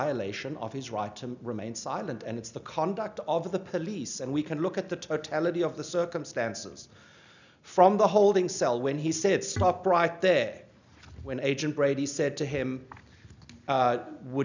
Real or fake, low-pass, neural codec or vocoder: real; 7.2 kHz; none